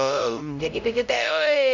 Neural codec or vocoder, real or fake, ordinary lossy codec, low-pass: codec, 16 kHz, 0.5 kbps, X-Codec, HuBERT features, trained on LibriSpeech; fake; none; 7.2 kHz